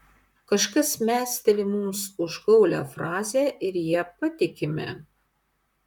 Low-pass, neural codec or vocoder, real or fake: 19.8 kHz; vocoder, 44.1 kHz, 128 mel bands, Pupu-Vocoder; fake